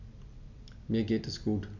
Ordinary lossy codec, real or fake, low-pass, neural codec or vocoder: MP3, 64 kbps; real; 7.2 kHz; none